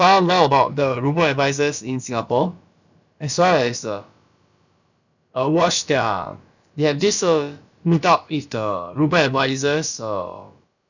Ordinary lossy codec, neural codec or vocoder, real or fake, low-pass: none; codec, 16 kHz, about 1 kbps, DyCAST, with the encoder's durations; fake; 7.2 kHz